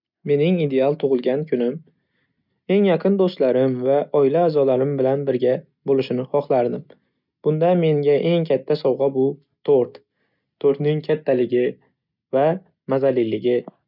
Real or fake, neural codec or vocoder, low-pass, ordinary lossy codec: real; none; 5.4 kHz; none